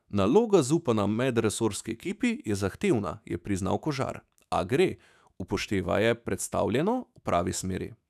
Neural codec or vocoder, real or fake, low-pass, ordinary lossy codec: autoencoder, 48 kHz, 128 numbers a frame, DAC-VAE, trained on Japanese speech; fake; 14.4 kHz; none